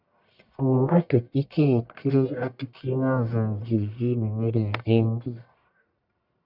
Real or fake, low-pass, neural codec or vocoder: fake; 5.4 kHz; codec, 44.1 kHz, 1.7 kbps, Pupu-Codec